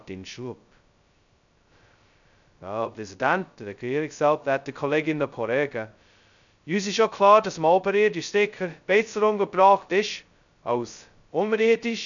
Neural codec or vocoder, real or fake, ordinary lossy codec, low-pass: codec, 16 kHz, 0.2 kbps, FocalCodec; fake; none; 7.2 kHz